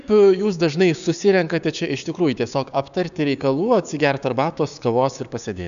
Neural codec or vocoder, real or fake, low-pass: codec, 16 kHz, 6 kbps, DAC; fake; 7.2 kHz